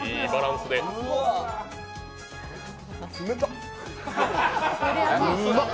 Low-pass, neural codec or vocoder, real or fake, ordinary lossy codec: none; none; real; none